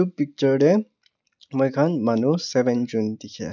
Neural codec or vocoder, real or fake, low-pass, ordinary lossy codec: none; real; 7.2 kHz; none